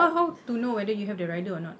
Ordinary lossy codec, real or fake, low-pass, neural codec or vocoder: none; real; none; none